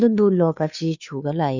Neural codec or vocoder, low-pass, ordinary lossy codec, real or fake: codec, 16 kHz, 2 kbps, FunCodec, trained on Chinese and English, 25 frames a second; 7.2 kHz; none; fake